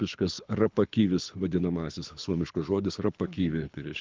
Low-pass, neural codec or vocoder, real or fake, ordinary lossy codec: 7.2 kHz; codec, 24 kHz, 6 kbps, HILCodec; fake; Opus, 32 kbps